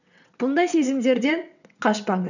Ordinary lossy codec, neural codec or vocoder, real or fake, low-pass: none; vocoder, 22.05 kHz, 80 mel bands, WaveNeXt; fake; 7.2 kHz